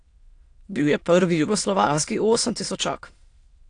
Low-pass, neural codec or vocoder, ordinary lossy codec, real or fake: 9.9 kHz; autoencoder, 22.05 kHz, a latent of 192 numbers a frame, VITS, trained on many speakers; AAC, 48 kbps; fake